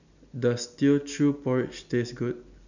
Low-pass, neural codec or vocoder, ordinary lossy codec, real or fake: 7.2 kHz; none; none; real